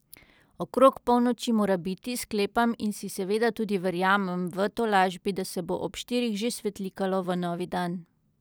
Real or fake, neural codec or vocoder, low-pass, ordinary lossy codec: real; none; none; none